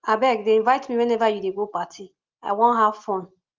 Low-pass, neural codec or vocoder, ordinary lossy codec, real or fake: 7.2 kHz; none; Opus, 32 kbps; real